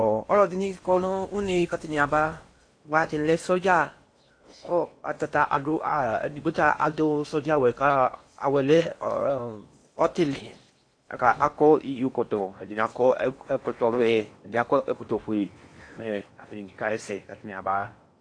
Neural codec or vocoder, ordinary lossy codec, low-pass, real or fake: codec, 16 kHz in and 24 kHz out, 0.8 kbps, FocalCodec, streaming, 65536 codes; AAC, 48 kbps; 9.9 kHz; fake